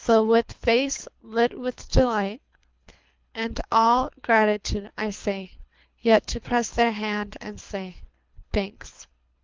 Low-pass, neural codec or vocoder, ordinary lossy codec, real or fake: 7.2 kHz; codec, 24 kHz, 3 kbps, HILCodec; Opus, 24 kbps; fake